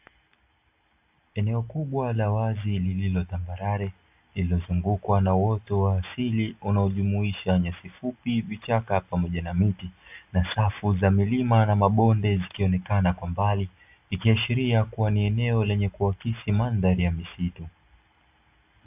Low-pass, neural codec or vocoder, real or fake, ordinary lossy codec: 3.6 kHz; none; real; AAC, 32 kbps